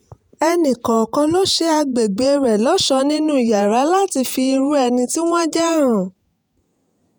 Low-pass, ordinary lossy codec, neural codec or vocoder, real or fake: none; none; vocoder, 48 kHz, 128 mel bands, Vocos; fake